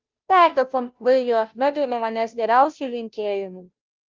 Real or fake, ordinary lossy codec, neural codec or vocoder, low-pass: fake; Opus, 32 kbps; codec, 16 kHz, 0.5 kbps, FunCodec, trained on Chinese and English, 25 frames a second; 7.2 kHz